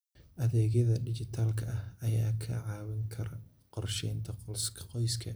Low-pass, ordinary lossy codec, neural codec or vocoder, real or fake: none; none; none; real